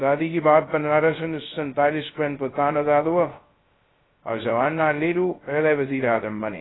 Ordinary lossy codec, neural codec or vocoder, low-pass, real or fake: AAC, 16 kbps; codec, 16 kHz, 0.2 kbps, FocalCodec; 7.2 kHz; fake